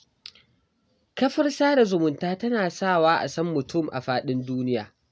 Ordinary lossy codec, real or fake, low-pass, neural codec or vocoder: none; real; none; none